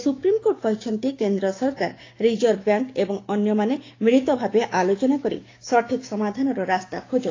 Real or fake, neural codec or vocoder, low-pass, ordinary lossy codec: fake; codec, 16 kHz, 6 kbps, DAC; 7.2 kHz; AAC, 32 kbps